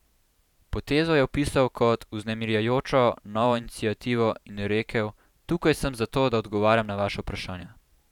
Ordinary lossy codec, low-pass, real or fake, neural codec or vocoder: none; 19.8 kHz; fake; vocoder, 44.1 kHz, 128 mel bands every 512 samples, BigVGAN v2